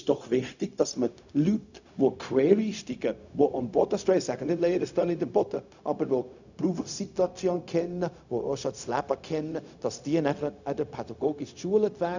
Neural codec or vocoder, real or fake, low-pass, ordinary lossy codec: codec, 16 kHz, 0.4 kbps, LongCat-Audio-Codec; fake; 7.2 kHz; none